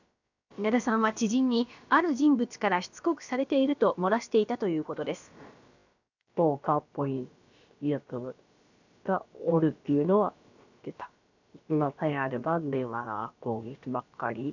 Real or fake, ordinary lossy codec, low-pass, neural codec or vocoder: fake; none; 7.2 kHz; codec, 16 kHz, about 1 kbps, DyCAST, with the encoder's durations